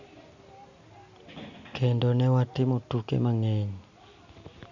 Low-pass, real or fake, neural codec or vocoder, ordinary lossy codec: 7.2 kHz; real; none; Opus, 64 kbps